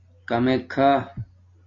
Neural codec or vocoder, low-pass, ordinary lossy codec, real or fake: none; 7.2 kHz; MP3, 48 kbps; real